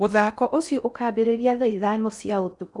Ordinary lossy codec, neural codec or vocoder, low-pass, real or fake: none; codec, 16 kHz in and 24 kHz out, 0.6 kbps, FocalCodec, streaming, 4096 codes; 10.8 kHz; fake